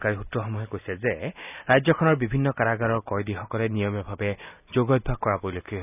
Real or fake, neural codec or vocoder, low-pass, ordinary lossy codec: real; none; 3.6 kHz; none